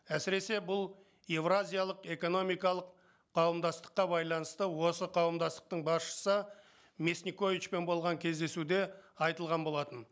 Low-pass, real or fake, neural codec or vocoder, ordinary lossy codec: none; real; none; none